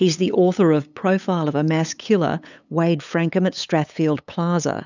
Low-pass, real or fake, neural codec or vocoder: 7.2 kHz; fake; codec, 16 kHz, 8 kbps, FunCodec, trained on LibriTTS, 25 frames a second